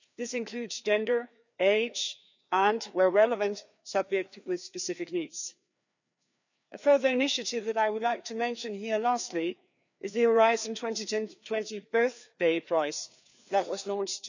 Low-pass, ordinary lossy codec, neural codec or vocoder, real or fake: 7.2 kHz; none; codec, 16 kHz, 2 kbps, FreqCodec, larger model; fake